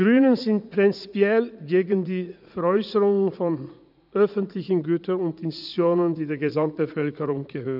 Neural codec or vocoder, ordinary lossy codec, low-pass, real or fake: vocoder, 44.1 kHz, 80 mel bands, Vocos; none; 5.4 kHz; fake